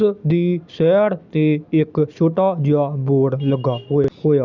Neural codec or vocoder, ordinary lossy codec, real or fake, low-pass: none; none; real; 7.2 kHz